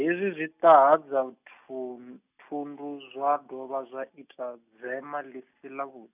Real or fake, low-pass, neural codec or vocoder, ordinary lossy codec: real; 3.6 kHz; none; none